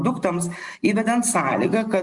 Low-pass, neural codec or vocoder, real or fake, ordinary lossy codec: 10.8 kHz; none; real; Opus, 64 kbps